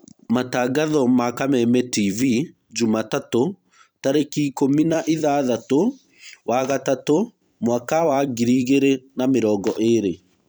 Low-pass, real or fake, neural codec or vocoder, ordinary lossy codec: none; fake; vocoder, 44.1 kHz, 128 mel bands every 256 samples, BigVGAN v2; none